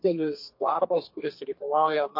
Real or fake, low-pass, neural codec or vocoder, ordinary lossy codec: fake; 5.4 kHz; codec, 32 kHz, 1.9 kbps, SNAC; MP3, 32 kbps